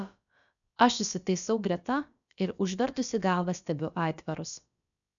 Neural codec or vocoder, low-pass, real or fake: codec, 16 kHz, about 1 kbps, DyCAST, with the encoder's durations; 7.2 kHz; fake